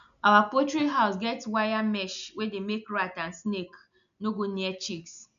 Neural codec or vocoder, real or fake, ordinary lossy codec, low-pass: none; real; none; 7.2 kHz